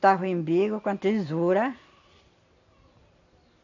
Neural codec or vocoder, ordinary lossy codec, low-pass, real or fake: none; none; 7.2 kHz; real